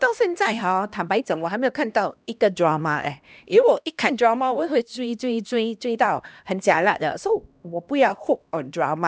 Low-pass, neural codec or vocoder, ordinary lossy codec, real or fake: none; codec, 16 kHz, 1 kbps, X-Codec, HuBERT features, trained on LibriSpeech; none; fake